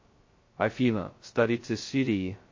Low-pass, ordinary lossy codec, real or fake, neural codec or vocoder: 7.2 kHz; MP3, 32 kbps; fake; codec, 16 kHz, 0.2 kbps, FocalCodec